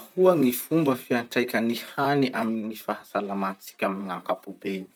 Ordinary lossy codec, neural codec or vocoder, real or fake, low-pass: none; vocoder, 44.1 kHz, 128 mel bands, Pupu-Vocoder; fake; none